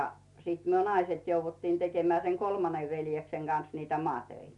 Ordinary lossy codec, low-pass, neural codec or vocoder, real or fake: MP3, 96 kbps; 10.8 kHz; none; real